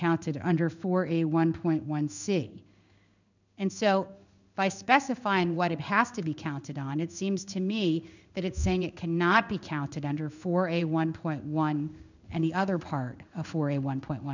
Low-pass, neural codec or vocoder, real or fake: 7.2 kHz; codec, 16 kHz in and 24 kHz out, 1 kbps, XY-Tokenizer; fake